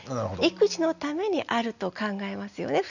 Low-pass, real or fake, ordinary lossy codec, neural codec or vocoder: 7.2 kHz; real; none; none